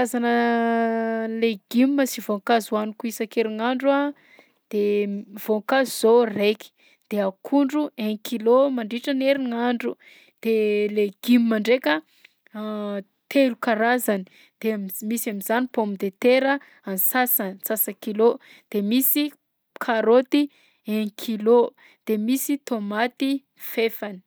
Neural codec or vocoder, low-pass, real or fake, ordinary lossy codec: none; none; real; none